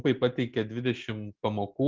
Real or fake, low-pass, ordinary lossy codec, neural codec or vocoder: real; 7.2 kHz; Opus, 32 kbps; none